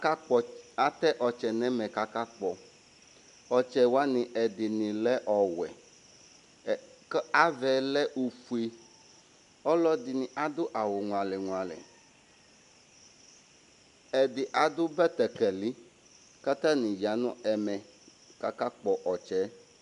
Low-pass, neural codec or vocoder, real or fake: 10.8 kHz; none; real